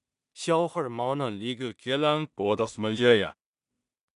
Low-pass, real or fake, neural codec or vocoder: 10.8 kHz; fake; codec, 16 kHz in and 24 kHz out, 0.4 kbps, LongCat-Audio-Codec, two codebook decoder